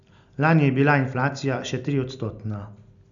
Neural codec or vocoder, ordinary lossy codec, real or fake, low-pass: none; none; real; 7.2 kHz